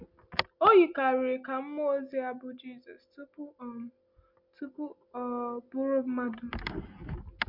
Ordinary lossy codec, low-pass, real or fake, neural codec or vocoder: MP3, 48 kbps; 5.4 kHz; real; none